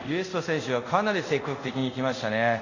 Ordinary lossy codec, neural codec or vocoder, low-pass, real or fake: none; codec, 24 kHz, 0.5 kbps, DualCodec; 7.2 kHz; fake